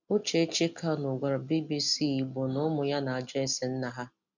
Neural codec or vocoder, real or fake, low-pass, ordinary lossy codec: none; real; 7.2 kHz; none